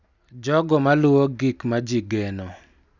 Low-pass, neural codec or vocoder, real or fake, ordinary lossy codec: 7.2 kHz; none; real; none